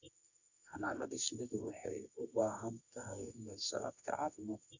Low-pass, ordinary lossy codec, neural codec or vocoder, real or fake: 7.2 kHz; none; codec, 24 kHz, 0.9 kbps, WavTokenizer, medium music audio release; fake